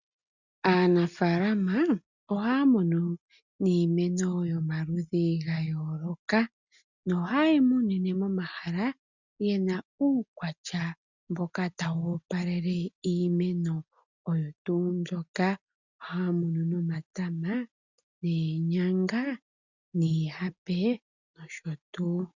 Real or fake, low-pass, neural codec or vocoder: real; 7.2 kHz; none